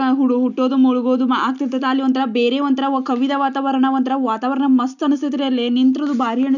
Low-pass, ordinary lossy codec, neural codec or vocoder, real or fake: 7.2 kHz; none; none; real